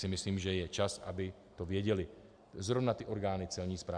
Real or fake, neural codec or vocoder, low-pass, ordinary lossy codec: real; none; 9.9 kHz; MP3, 96 kbps